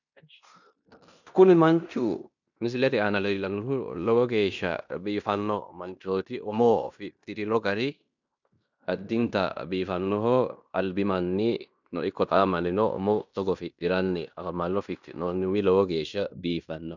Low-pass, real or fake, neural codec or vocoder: 7.2 kHz; fake; codec, 16 kHz in and 24 kHz out, 0.9 kbps, LongCat-Audio-Codec, fine tuned four codebook decoder